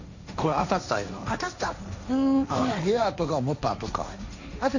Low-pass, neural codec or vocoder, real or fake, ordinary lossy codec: 7.2 kHz; codec, 16 kHz, 1.1 kbps, Voila-Tokenizer; fake; none